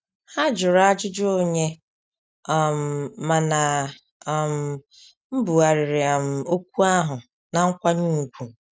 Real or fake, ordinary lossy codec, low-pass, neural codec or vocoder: real; none; none; none